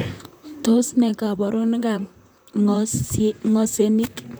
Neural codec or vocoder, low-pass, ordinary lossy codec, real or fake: vocoder, 44.1 kHz, 128 mel bands, Pupu-Vocoder; none; none; fake